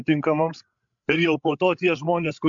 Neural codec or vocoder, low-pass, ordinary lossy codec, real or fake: codec, 16 kHz, 16 kbps, FreqCodec, larger model; 7.2 kHz; MP3, 64 kbps; fake